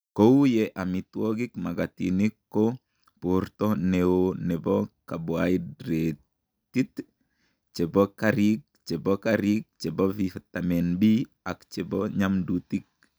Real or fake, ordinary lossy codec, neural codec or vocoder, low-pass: real; none; none; none